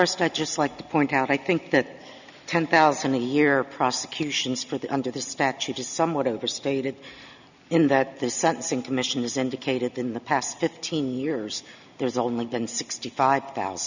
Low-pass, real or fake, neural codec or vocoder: 7.2 kHz; real; none